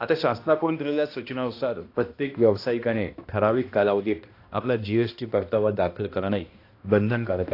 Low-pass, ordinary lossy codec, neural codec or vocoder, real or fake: 5.4 kHz; AAC, 32 kbps; codec, 16 kHz, 1 kbps, X-Codec, HuBERT features, trained on balanced general audio; fake